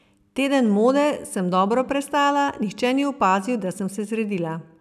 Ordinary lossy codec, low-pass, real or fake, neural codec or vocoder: none; 14.4 kHz; real; none